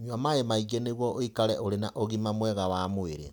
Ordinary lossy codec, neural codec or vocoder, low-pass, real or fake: none; none; none; real